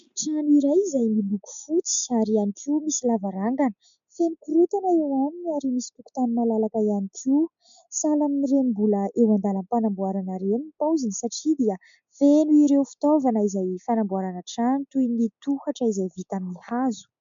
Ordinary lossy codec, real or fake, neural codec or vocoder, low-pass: MP3, 64 kbps; real; none; 7.2 kHz